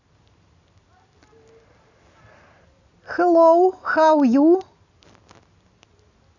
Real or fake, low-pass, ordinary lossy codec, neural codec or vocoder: real; 7.2 kHz; none; none